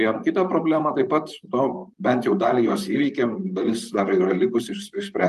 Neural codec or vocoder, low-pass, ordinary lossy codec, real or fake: vocoder, 44.1 kHz, 128 mel bands, Pupu-Vocoder; 14.4 kHz; Opus, 32 kbps; fake